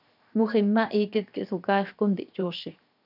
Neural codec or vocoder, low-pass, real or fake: codec, 16 kHz, 0.7 kbps, FocalCodec; 5.4 kHz; fake